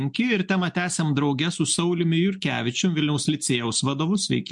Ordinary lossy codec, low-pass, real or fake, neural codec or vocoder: MP3, 64 kbps; 10.8 kHz; real; none